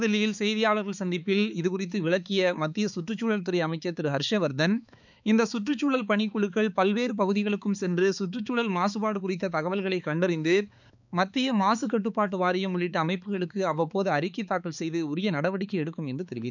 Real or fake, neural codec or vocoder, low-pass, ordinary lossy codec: fake; codec, 16 kHz, 4 kbps, X-Codec, HuBERT features, trained on balanced general audio; 7.2 kHz; none